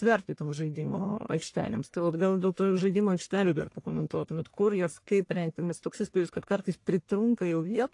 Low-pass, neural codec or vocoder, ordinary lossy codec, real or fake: 10.8 kHz; codec, 44.1 kHz, 1.7 kbps, Pupu-Codec; AAC, 48 kbps; fake